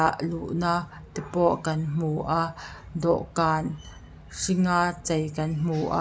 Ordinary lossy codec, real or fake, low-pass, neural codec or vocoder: none; real; none; none